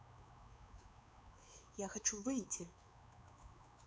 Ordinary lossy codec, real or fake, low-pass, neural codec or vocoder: none; fake; none; codec, 16 kHz, 4 kbps, X-Codec, WavLM features, trained on Multilingual LibriSpeech